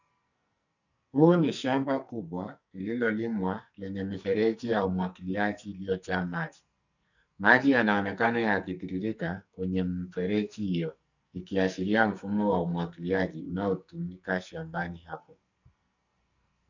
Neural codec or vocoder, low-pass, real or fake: codec, 44.1 kHz, 2.6 kbps, SNAC; 7.2 kHz; fake